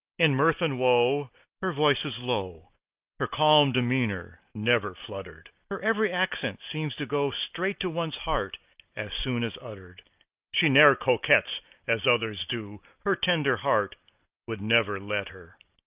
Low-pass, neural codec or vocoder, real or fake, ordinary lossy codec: 3.6 kHz; none; real; Opus, 24 kbps